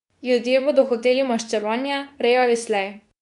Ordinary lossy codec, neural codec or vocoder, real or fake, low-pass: none; codec, 24 kHz, 0.9 kbps, WavTokenizer, medium speech release version 2; fake; 10.8 kHz